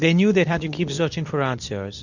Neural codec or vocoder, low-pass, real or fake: codec, 24 kHz, 0.9 kbps, WavTokenizer, medium speech release version 2; 7.2 kHz; fake